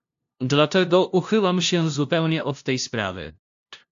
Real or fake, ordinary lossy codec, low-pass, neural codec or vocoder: fake; AAC, 64 kbps; 7.2 kHz; codec, 16 kHz, 0.5 kbps, FunCodec, trained on LibriTTS, 25 frames a second